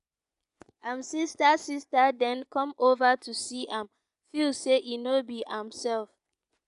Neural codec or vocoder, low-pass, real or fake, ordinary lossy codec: vocoder, 24 kHz, 100 mel bands, Vocos; 10.8 kHz; fake; none